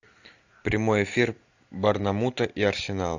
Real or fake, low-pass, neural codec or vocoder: real; 7.2 kHz; none